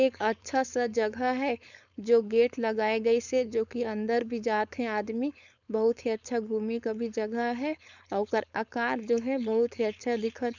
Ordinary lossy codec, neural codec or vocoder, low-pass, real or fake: none; codec, 16 kHz, 4.8 kbps, FACodec; 7.2 kHz; fake